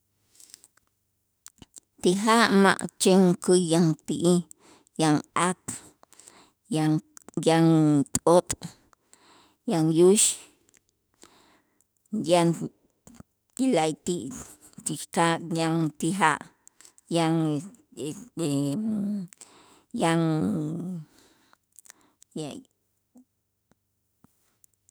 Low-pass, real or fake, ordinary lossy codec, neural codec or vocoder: none; fake; none; autoencoder, 48 kHz, 32 numbers a frame, DAC-VAE, trained on Japanese speech